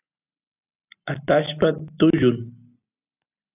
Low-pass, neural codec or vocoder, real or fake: 3.6 kHz; none; real